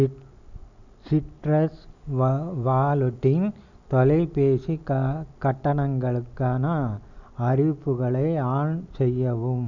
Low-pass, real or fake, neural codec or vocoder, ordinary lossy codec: 7.2 kHz; real; none; none